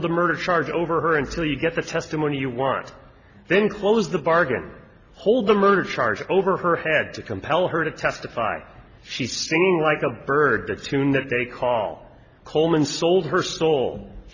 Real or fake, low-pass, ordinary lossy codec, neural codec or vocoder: real; 7.2 kHz; Opus, 64 kbps; none